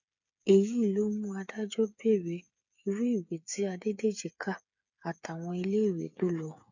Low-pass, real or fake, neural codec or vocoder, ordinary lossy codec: 7.2 kHz; fake; codec, 16 kHz, 8 kbps, FreqCodec, smaller model; none